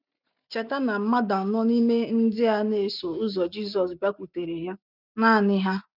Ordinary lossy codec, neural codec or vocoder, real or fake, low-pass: none; none; real; 5.4 kHz